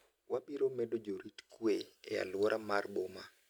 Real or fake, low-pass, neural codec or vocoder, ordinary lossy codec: real; none; none; none